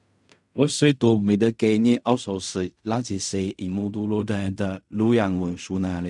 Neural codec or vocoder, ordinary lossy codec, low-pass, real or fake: codec, 16 kHz in and 24 kHz out, 0.4 kbps, LongCat-Audio-Codec, fine tuned four codebook decoder; none; 10.8 kHz; fake